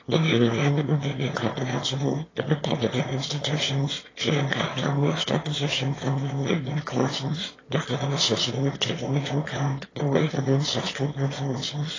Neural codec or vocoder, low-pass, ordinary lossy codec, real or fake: autoencoder, 22.05 kHz, a latent of 192 numbers a frame, VITS, trained on one speaker; 7.2 kHz; AAC, 32 kbps; fake